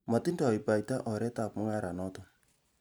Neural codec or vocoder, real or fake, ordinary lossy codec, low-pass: none; real; none; none